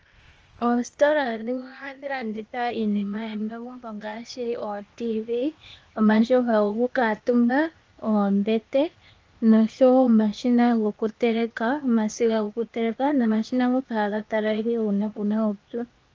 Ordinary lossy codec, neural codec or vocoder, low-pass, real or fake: Opus, 24 kbps; codec, 16 kHz, 0.8 kbps, ZipCodec; 7.2 kHz; fake